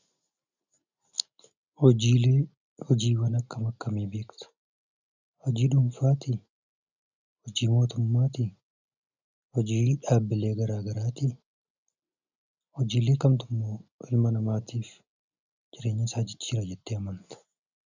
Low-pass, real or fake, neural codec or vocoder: 7.2 kHz; real; none